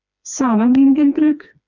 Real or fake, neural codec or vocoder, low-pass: fake; codec, 16 kHz, 2 kbps, FreqCodec, smaller model; 7.2 kHz